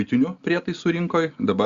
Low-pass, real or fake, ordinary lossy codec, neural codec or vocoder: 7.2 kHz; real; Opus, 64 kbps; none